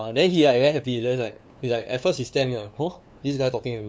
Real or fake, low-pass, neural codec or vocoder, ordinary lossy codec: fake; none; codec, 16 kHz, 2 kbps, FunCodec, trained on LibriTTS, 25 frames a second; none